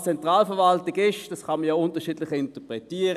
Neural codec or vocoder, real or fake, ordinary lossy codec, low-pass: none; real; none; 14.4 kHz